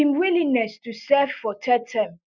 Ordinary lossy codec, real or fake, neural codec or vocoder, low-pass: none; real; none; 7.2 kHz